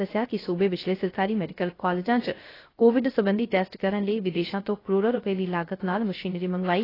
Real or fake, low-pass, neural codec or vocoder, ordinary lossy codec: fake; 5.4 kHz; codec, 16 kHz, 0.3 kbps, FocalCodec; AAC, 24 kbps